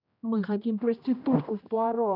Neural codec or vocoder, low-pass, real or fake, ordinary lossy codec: codec, 16 kHz, 1 kbps, X-Codec, HuBERT features, trained on general audio; 5.4 kHz; fake; none